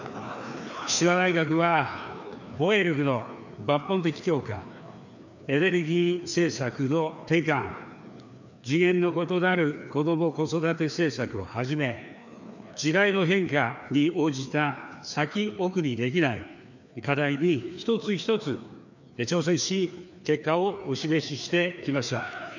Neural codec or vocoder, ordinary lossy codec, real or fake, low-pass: codec, 16 kHz, 2 kbps, FreqCodec, larger model; none; fake; 7.2 kHz